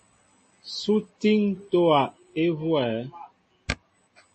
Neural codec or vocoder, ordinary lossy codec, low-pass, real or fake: none; MP3, 32 kbps; 10.8 kHz; real